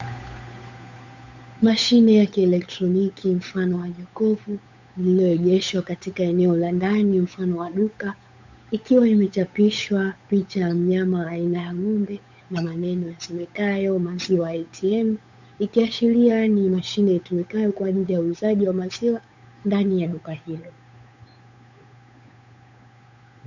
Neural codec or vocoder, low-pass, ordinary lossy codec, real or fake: codec, 16 kHz, 8 kbps, FunCodec, trained on Chinese and English, 25 frames a second; 7.2 kHz; MP3, 64 kbps; fake